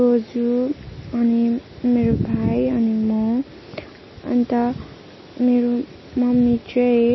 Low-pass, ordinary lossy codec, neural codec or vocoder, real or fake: 7.2 kHz; MP3, 24 kbps; none; real